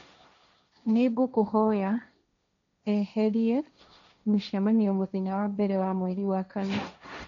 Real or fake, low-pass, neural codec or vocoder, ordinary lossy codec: fake; 7.2 kHz; codec, 16 kHz, 1.1 kbps, Voila-Tokenizer; none